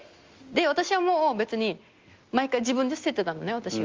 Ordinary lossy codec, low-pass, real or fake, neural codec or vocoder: Opus, 32 kbps; 7.2 kHz; real; none